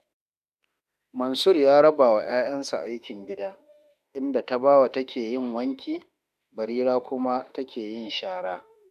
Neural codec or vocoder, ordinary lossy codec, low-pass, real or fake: autoencoder, 48 kHz, 32 numbers a frame, DAC-VAE, trained on Japanese speech; none; 14.4 kHz; fake